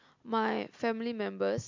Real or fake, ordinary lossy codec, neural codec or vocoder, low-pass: real; MP3, 48 kbps; none; 7.2 kHz